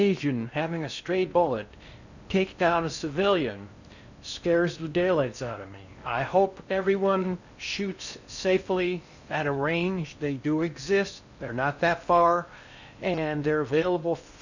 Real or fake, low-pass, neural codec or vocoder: fake; 7.2 kHz; codec, 16 kHz in and 24 kHz out, 0.6 kbps, FocalCodec, streaming, 4096 codes